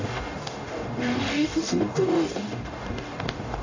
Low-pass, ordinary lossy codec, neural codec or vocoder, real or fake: 7.2 kHz; AAC, 48 kbps; codec, 44.1 kHz, 0.9 kbps, DAC; fake